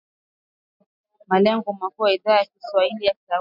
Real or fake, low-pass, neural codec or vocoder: real; 5.4 kHz; none